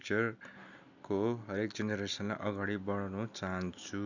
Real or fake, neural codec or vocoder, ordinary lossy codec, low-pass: real; none; none; 7.2 kHz